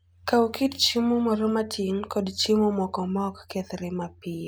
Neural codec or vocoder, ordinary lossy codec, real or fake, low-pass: none; none; real; none